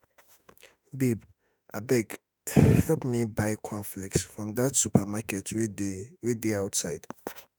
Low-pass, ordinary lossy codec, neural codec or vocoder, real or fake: none; none; autoencoder, 48 kHz, 32 numbers a frame, DAC-VAE, trained on Japanese speech; fake